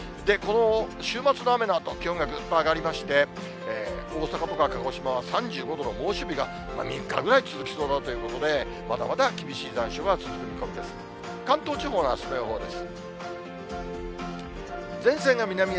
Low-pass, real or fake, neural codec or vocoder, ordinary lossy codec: none; real; none; none